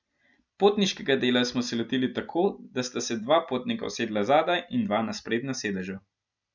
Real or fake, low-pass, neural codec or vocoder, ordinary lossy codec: real; 7.2 kHz; none; none